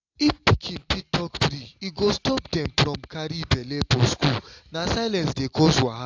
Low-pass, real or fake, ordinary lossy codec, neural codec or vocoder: 7.2 kHz; real; AAC, 48 kbps; none